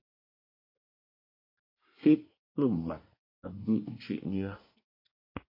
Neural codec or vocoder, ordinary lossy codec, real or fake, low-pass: codec, 24 kHz, 1 kbps, SNAC; MP3, 32 kbps; fake; 5.4 kHz